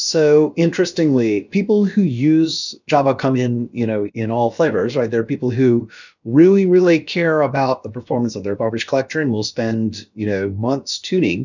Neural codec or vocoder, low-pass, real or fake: codec, 16 kHz, about 1 kbps, DyCAST, with the encoder's durations; 7.2 kHz; fake